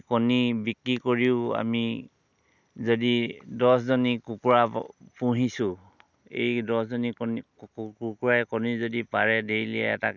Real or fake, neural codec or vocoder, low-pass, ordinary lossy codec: real; none; 7.2 kHz; none